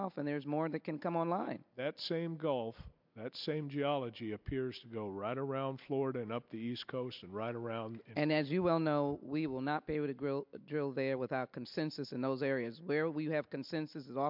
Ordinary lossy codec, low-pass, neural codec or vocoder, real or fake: MP3, 48 kbps; 5.4 kHz; none; real